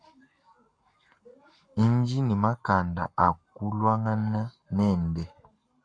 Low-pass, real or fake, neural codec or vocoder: 9.9 kHz; fake; codec, 44.1 kHz, 7.8 kbps, Pupu-Codec